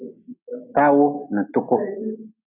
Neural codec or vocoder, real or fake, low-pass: none; real; 3.6 kHz